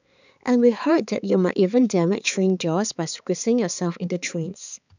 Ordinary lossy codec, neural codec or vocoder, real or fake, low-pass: none; codec, 16 kHz, 4 kbps, X-Codec, HuBERT features, trained on balanced general audio; fake; 7.2 kHz